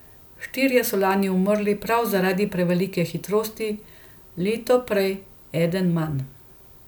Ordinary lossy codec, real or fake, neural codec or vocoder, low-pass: none; real; none; none